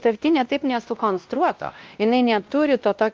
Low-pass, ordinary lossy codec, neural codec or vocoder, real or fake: 7.2 kHz; Opus, 32 kbps; codec, 16 kHz, 1 kbps, X-Codec, WavLM features, trained on Multilingual LibriSpeech; fake